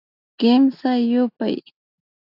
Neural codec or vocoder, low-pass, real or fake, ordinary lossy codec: none; 5.4 kHz; real; AAC, 48 kbps